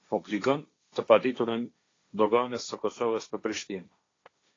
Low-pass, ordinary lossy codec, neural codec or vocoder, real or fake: 7.2 kHz; AAC, 32 kbps; codec, 16 kHz, 1.1 kbps, Voila-Tokenizer; fake